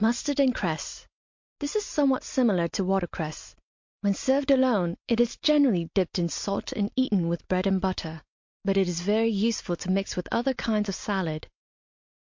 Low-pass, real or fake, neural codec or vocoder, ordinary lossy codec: 7.2 kHz; real; none; MP3, 48 kbps